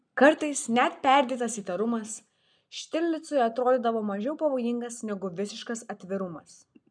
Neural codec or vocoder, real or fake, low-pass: none; real; 9.9 kHz